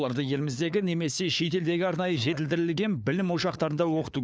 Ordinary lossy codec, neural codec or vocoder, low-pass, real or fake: none; codec, 16 kHz, 4 kbps, FunCodec, trained on LibriTTS, 50 frames a second; none; fake